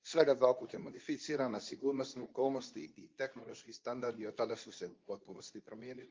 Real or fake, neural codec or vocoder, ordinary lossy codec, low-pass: fake; codec, 24 kHz, 0.9 kbps, WavTokenizer, medium speech release version 2; Opus, 32 kbps; 7.2 kHz